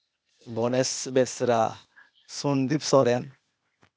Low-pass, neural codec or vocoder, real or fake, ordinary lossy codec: none; codec, 16 kHz, 0.8 kbps, ZipCodec; fake; none